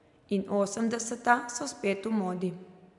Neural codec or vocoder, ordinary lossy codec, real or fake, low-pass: none; none; real; 10.8 kHz